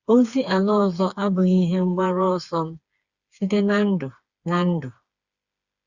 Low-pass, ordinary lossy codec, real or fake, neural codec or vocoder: 7.2 kHz; Opus, 64 kbps; fake; codec, 16 kHz, 4 kbps, FreqCodec, smaller model